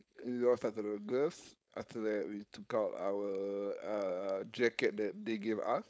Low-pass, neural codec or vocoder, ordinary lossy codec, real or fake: none; codec, 16 kHz, 4.8 kbps, FACodec; none; fake